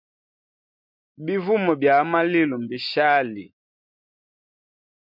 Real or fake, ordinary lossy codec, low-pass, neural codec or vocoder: real; MP3, 48 kbps; 5.4 kHz; none